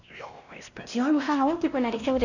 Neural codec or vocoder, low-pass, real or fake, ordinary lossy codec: codec, 16 kHz, 1 kbps, X-Codec, WavLM features, trained on Multilingual LibriSpeech; 7.2 kHz; fake; none